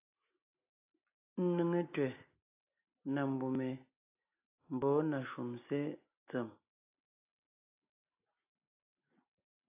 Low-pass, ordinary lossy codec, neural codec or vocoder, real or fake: 3.6 kHz; AAC, 24 kbps; none; real